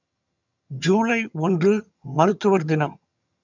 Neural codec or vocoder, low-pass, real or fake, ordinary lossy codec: vocoder, 22.05 kHz, 80 mel bands, HiFi-GAN; 7.2 kHz; fake; none